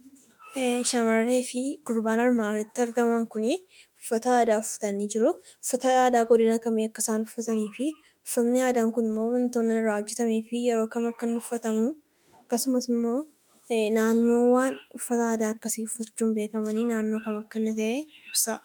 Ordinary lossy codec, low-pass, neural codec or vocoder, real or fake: MP3, 96 kbps; 19.8 kHz; autoencoder, 48 kHz, 32 numbers a frame, DAC-VAE, trained on Japanese speech; fake